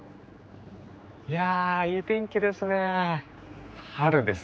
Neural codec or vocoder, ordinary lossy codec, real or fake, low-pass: codec, 16 kHz, 4 kbps, X-Codec, HuBERT features, trained on general audio; none; fake; none